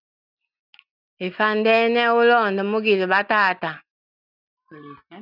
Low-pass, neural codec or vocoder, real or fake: 5.4 kHz; none; real